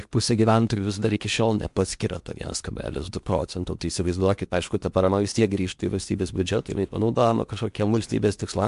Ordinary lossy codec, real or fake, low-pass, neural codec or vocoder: MP3, 64 kbps; fake; 10.8 kHz; codec, 16 kHz in and 24 kHz out, 0.8 kbps, FocalCodec, streaming, 65536 codes